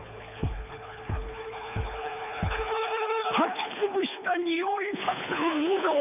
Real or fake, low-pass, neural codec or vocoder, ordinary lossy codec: fake; 3.6 kHz; codec, 24 kHz, 3 kbps, HILCodec; none